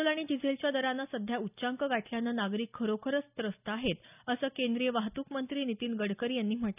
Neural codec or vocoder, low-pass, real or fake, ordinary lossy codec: none; 3.6 kHz; real; none